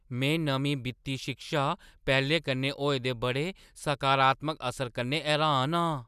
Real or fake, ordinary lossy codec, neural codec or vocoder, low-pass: real; none; none; 14.4 kHz